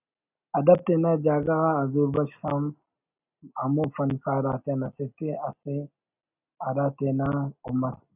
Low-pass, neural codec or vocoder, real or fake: 3.6 kHz; none; real